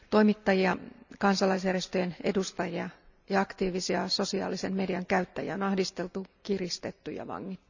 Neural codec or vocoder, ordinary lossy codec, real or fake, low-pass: none; none; real; 7.2 kHz